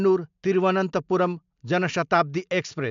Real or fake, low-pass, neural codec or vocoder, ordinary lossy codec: real; 7.2 kHz; none; MP3, 64 kbps